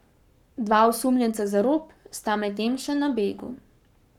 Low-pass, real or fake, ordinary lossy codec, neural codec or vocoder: 19.8 kHz; fake; none; codec, 44.1 kHz, 7.8 kbps, Pupu-Codec